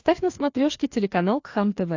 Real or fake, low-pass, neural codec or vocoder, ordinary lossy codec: fake; 7.2 kHz; codec, 16 kHz, 2 kbps, FreqCodec, larger model; AAC, 48 kbps